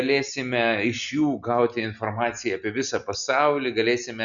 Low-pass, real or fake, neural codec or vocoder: 7.2 kHz; real; none